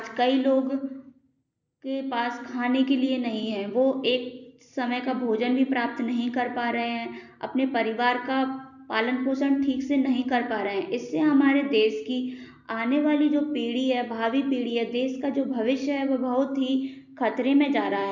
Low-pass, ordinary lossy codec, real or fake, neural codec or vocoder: 7.2 kHz; none; real; none